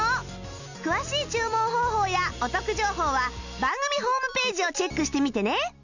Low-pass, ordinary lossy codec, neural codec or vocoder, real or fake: 7.2 kHz; none; none; real